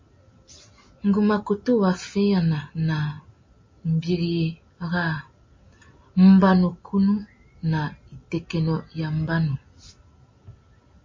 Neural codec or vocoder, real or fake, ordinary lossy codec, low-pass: none; real; MP3, 32 kbps; 7.2 kHz